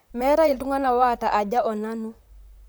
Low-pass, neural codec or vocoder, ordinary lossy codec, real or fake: none; vocoder, 44.1 kHz, 128 mel bands, Pupu-Vocoder; none; fake